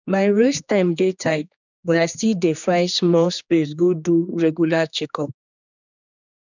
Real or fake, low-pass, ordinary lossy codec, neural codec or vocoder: fake; 7.2 kHz; none; codec, 16 kHz, 2 kbps, X-Codec, HuBERT features, trained on general audio